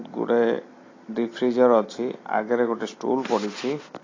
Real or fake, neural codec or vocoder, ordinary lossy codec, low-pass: real; none; AAC, 32 kbps; 7.2 kHz